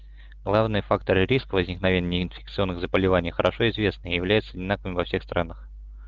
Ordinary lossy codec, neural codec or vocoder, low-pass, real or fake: Opus, 24 kbps; none; 7.2 kHz; real